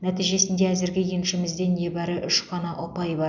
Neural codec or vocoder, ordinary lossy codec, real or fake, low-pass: none; none; real; 7.2 kHz